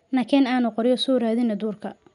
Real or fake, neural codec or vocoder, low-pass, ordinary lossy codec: real; none; 10.8 kHz; none